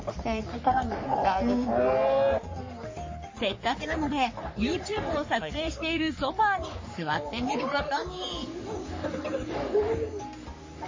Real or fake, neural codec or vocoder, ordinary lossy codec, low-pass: fake; codec, 44.1 kHz, 3.4 kbps, Pupu-Codec; MP3, 32 kbps; 7.2 kHz